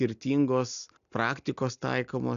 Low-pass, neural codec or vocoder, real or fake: 7.2 kHz; none; real